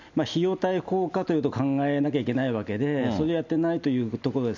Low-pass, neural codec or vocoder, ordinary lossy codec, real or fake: 7.2 kHz; none; none; real